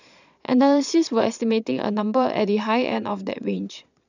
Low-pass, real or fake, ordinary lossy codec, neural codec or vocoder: 7.2 kHz; fake; none; vocoder, 22.05 kHz, 80 mel bands, Vocos